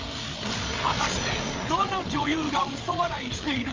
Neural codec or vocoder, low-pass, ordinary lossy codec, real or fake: codec, 16 kHz, 8 kbps, FreqCodec, larger model; 7.2 kHz; Opus, 32 kbps; fake